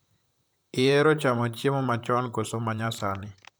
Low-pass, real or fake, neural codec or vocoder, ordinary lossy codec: none; fake; vocoder, 44.1 kHz, 128 mel bands, Pupu-Vocoder; none